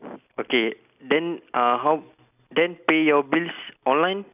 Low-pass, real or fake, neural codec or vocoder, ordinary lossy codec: 3.6 kHz; real; none; none